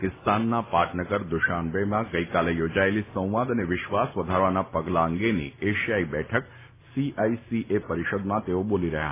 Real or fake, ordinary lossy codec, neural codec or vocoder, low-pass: real; AAC, 24 kbps; none; 3.6 kHz